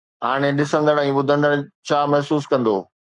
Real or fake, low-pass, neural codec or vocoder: fake; 10.8 kHz; codec, 44.1 kHz, 7.8 kbps, Pupu-Codec